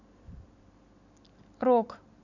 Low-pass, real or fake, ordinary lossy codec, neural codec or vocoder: 7.2 kHz; real; none; none